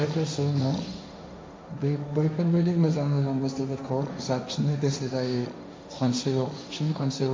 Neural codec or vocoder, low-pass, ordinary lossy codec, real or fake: codec, 16 kHz, 1.1 kbps, Voila-Tokenizer; none; none; fake